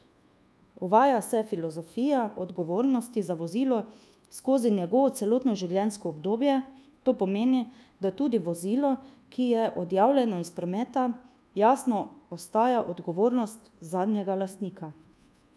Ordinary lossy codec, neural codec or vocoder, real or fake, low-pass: none; codec, 24 kHz, 1.2 kbps, DualCodec; fake; none